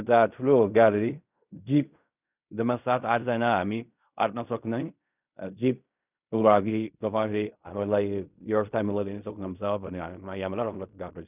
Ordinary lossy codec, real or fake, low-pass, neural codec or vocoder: none; fake; 3.6 kHz; codec, 16 kHz in and 24 kHz out, 0.4 kbps, LongCat-Audio-Codec, fine tuned four codebook decoder